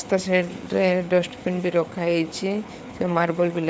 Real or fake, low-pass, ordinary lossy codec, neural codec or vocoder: fake; none; none; codec, 16 kHz, 4 kbps, FreqCodec, larger model